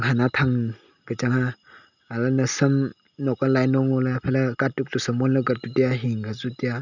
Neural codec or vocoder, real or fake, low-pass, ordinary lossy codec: none; real; 7.2 kHz; none